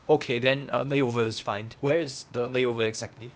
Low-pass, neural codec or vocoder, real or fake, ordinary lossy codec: none; codec, 16 kHz, 0.8 kbps, ZipCodec; fake; none